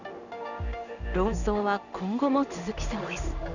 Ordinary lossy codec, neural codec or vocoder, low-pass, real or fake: none; codec, 16 kHz in and 24 kHz out, 1 kbps, XY-Tokenizer; 7.2 kHz; fake